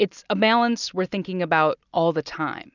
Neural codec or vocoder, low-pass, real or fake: none; 7.2 kHz; real